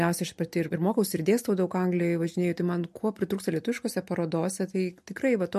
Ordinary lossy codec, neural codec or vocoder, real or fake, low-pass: MP3, 64 kbps; none; real; 14.4 kHz